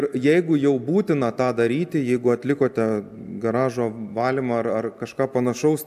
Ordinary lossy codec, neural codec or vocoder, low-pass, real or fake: AAC, 96 kbps; none; 14.4 kHz; real